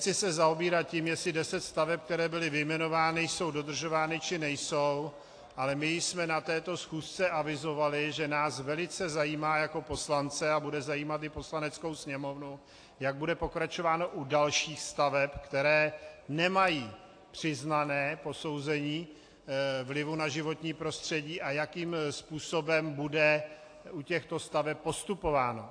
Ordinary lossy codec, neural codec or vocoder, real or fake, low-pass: AAC, 48 kbps; none; real; 9.9 kHz